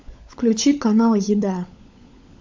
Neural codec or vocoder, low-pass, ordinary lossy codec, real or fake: codec, 16 kHz, 16 kbps, FunCodec, trained on LibriTTS, 50 frames a second; 7.2 kHz; MP3, 64 kbps; fake